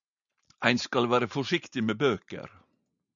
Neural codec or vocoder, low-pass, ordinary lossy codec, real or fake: none; 7.2 kHz; MP3, 96 kbps; real